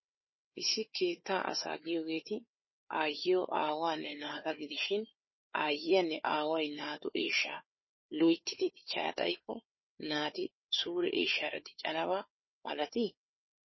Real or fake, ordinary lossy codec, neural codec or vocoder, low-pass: fake; MP3, 24 kbps; codec, 16 kHz, 4 kbps, FunCodec, trained on Chinese and English, 50 frames a second; 7.2 kHz